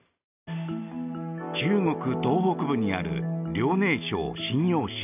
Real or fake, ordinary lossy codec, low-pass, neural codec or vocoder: real; none; 3.6 kHz; none